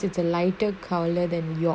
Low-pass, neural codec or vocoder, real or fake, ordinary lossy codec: none; none; real; none